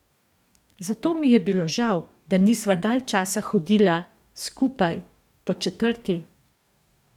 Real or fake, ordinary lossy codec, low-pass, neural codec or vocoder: fake; none; 19.8 kHz; codec, 44.1 kHz, 2.6 kbps, DAC